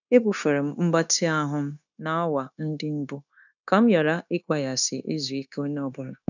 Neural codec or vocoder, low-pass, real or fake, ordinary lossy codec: codec, 16 kHz, 0.9 kbps, LongCat-Audio-Codec; 7.2 kHz; fake; none